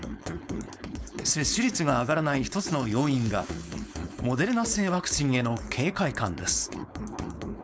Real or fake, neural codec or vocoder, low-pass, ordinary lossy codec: fake; codec, 16 kHz, 4.8 kbps, FACodec; none; none